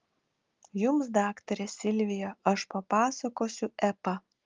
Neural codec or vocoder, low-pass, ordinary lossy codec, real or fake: none; 7.2 kHz; Opus, 32 kbps; real